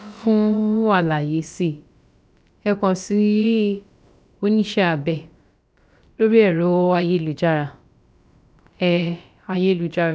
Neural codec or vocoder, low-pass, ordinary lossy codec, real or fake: codec, 16 kHz, about 1 kbps, DyCAST, with the encoder's durations; none; none; fake